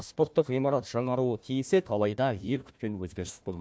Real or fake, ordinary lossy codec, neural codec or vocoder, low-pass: fake; none; codec, 16 kHz, 1 kbps, FunCodec, trained on Chinese and English, 50 frames a second; none